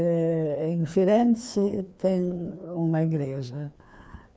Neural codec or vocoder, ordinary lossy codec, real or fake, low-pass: codec, 16 kHz, 2 kbps, FreqCodec, larger model; none; fake; none